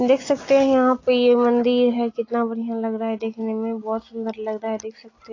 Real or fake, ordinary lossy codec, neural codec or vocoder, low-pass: real; AAC, 32 kbps; none; 7.2 kHz